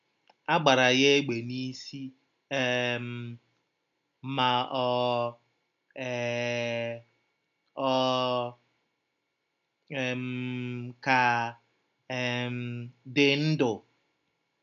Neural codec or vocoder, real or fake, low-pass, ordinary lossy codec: none; real; 7.2 kHz; none